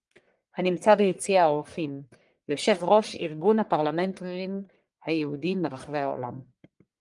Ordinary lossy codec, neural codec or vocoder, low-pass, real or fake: Opus, 32 kbps; codec, 44.1 kHz, 1.7 kbps, Pupu-Codec; 10.8 kHz; fake